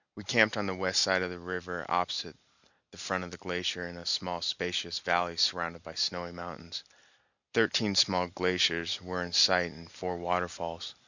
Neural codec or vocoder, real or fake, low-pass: none; real; 7.2 kHz